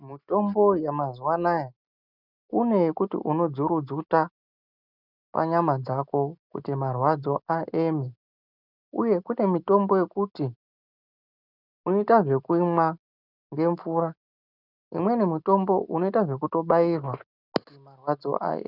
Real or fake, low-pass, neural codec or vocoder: real; 5.4 kHz; none